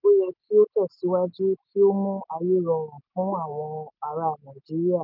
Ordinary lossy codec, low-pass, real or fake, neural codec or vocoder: none; 5.4 kHz; real; none